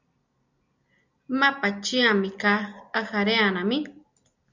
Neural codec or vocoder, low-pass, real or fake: none; 7.2 kHz; real